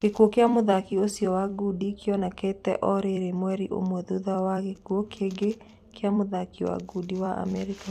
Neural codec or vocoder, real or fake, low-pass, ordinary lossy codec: vocoder, 44.1 kHz, 128 mel bands every 256 samples, BigVGAN v2; fake; 14.4 kHz; none